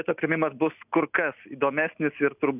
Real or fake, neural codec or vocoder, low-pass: real; none; 3.6 kHz